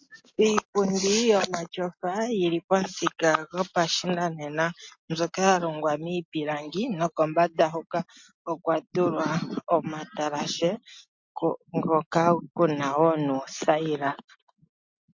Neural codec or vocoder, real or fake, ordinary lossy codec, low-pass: none; real; MP3, 48 kbps; 7.2 kHz